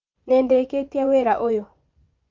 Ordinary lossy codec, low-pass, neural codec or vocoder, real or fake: Opus, 32 kbps; 7.2 kHz; vocoder, 24 kHz, 100 mel bands, Vocos; fake